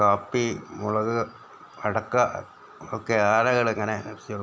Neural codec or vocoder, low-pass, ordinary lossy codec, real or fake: none; none; none; real